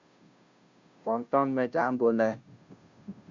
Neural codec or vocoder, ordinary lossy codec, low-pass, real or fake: codec, 16 kHz, 0.5 kbps, FunCodec, trained on Chinese and English, 25 frames a second; Opus, 64 kbps; 7.2 kHz; fake